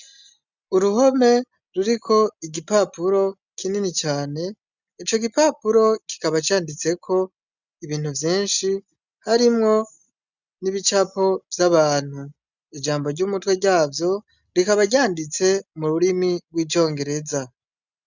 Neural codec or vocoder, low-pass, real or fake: none; 7.2 kHz; real